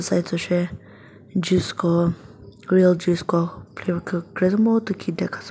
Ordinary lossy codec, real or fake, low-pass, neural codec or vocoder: none; real; none; none